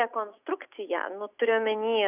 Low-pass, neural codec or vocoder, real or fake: 3.6 kHz; none; real